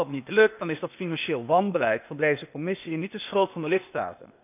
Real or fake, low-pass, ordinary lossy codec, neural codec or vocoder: fake; 3.6 kHz; none; codec, 16 kHz, 0.8 kbps, ZipCodec